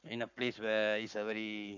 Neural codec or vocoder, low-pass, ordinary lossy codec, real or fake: codec, 44.1 kHz, 7.8 kbps, Pupu-Codec; 7.2 kHz; none; fake